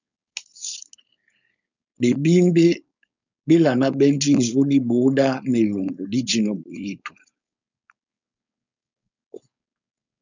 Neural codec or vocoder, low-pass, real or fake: codec, 16 kHz, 4.8 kbps, FACodec; 7.2 kHz; fake